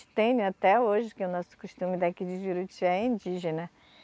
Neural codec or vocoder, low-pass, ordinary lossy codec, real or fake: none; none; none; real